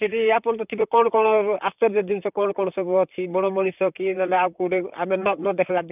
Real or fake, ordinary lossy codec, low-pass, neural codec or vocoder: fake; none; 3.6 kHz; vocoder, 44.1 kHz, 128 mel bands, Pupu-Vocoder